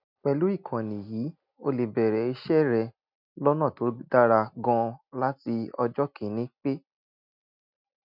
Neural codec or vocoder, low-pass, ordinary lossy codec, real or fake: none; 5.4 kHz; none; real